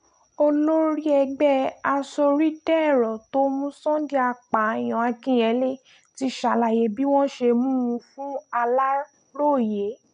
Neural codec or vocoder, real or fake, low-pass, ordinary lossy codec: none; real; 9.9 kHz; none